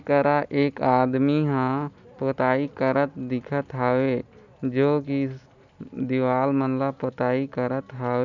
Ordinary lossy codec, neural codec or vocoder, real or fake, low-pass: none; none; real; 7.2 kHz